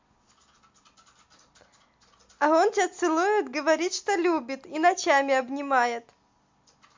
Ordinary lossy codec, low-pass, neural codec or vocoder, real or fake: MP3, 48 kbps; 7.2 kHz; none; real